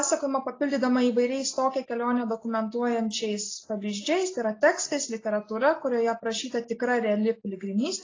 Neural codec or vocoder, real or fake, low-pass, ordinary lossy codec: none; real; 7.2 kHz; AAC, 32 kbps